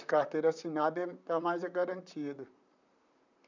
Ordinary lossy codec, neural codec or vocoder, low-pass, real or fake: none; vocoder, 44.1 kHz, 128 mel bands, Pupu-Vocoder; 7.2 kHz; fake